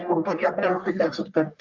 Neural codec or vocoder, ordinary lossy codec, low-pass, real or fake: codec, 44.1 kHz, 1.7 kbps, Pupu-Codec; Opus, 24 kbps; 7.2 kHz; fake